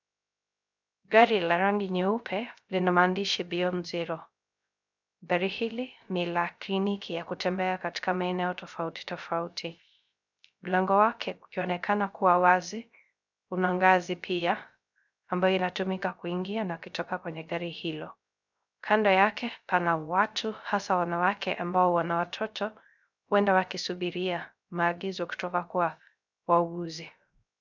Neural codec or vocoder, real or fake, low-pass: codec, 16 kHz, 0.3 kbps, FocalCodec; fake; 7.2 kHz